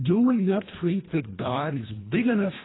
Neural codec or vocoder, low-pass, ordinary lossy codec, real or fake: codec, 24 kHz, 1.5 kbps, HILCodec; 7.2 kHz; AAC, 16 kbps; fake